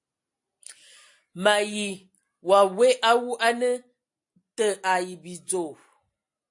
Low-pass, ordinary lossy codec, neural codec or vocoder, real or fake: 10.8 kHz; AAC, 64 kbps; none; real